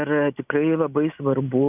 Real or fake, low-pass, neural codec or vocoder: real; 3.6 kHz; none